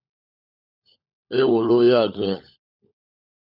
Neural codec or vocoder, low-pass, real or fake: codec, 16 kHz, 16 kbps, FunCodec, trained on LibriTTS, 50 frames a second; 5.4 kHz; fake